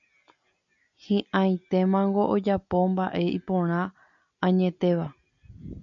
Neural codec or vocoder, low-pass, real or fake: none; 7.2 kHz; real